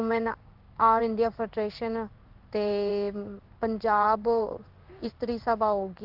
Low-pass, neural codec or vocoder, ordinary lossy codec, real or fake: 5.4 kHz; codec, 16 kHz in and 24 kHz out, 1 kbps, XY-Tokenizer; Opus, 32 kbps; fake